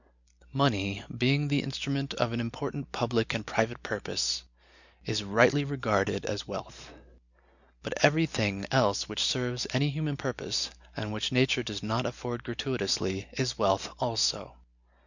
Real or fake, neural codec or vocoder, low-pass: real; none; 7.2 kHz